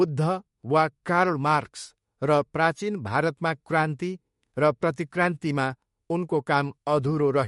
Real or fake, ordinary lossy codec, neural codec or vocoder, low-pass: fake; MP3, 48 kbps; autoencoder, 48 kHz, 32 numbers a frame, DAC-VAE, trained on Japanese speech; 19.8 kHz